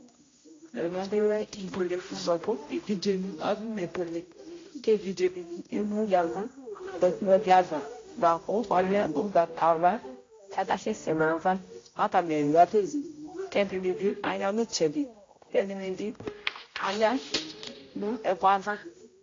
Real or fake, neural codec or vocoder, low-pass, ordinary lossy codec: fake; codec, 16 kHz, 0.5 kbps, X-Codec, HuBERT features, trained on general audio; 7.2 kHz; AAC, 32 kbps